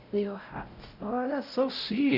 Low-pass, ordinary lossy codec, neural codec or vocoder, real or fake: 5.4 kHz; none; codec, 16 kHz, 0.5 kbps, X-Codec, WavLM features, trained on Multilingual LibriSpeech; fake